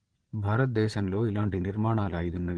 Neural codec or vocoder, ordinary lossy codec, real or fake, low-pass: vocoder, 22.05 kHz, 80 mel bands, Vocos; Opus, 16 kbps; fake; 9.9 kHz